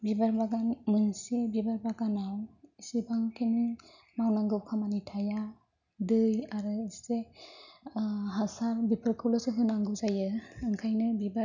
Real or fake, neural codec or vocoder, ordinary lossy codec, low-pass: real; none; none; 7.2 kHz